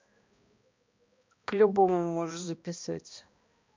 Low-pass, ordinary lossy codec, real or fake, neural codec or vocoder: 7.2 kHz; MP3, 64 kbps; fake; codec, 16 kHz, 1 kbps, X-Codec, HuBERT features, trained on balanced general audio